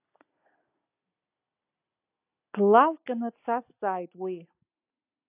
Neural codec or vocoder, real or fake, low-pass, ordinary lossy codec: none; real; 3.6 kHz; AAC, 32 kbps